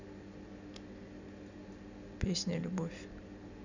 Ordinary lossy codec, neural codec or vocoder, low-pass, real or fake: none; none; 7.2 kHz; real